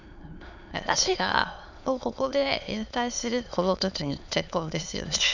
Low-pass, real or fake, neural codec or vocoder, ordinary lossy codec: 7.2 kHz; fake; autoencoder, 22.05 kHz, a latent of 192 numbers a frame, VITS, trained on many speakers; none